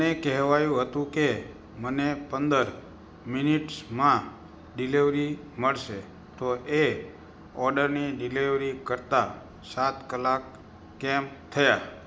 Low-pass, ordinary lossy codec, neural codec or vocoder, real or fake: none; none; none; real